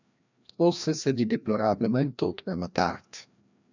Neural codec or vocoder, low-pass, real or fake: codec, 16 kHz, 1 kbps, FreqCodec, larger model; 7.2 kHz; fake